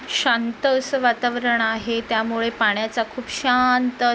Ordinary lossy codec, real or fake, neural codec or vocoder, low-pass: none; real; none; none